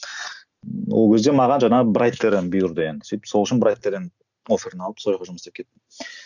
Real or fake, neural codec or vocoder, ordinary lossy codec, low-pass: real; none; none; 7.2 kHz